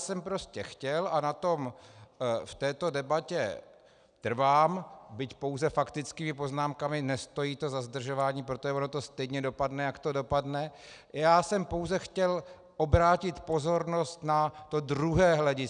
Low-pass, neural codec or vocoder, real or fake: 9.9 kHz; none; real